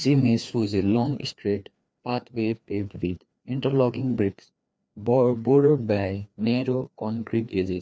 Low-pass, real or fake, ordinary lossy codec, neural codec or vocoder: none; fake; none; codec, 16 kHz, 2 kbps, FreqCodec, larger model